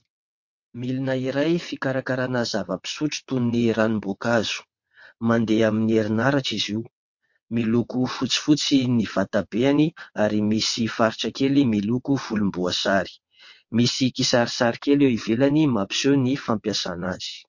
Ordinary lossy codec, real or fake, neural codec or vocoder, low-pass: MP3, 48 kbps; fake; vocoder, 22.05 kHz, 80 mel bands, WaveNeXt; 7.2 kHz